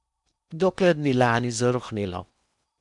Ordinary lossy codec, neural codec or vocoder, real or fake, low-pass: none; codec, 16 kHz in and 24 kHz out, 0.8 kbps, FocalCodec, streaming, 65536 codes; fake; 10.8 kHz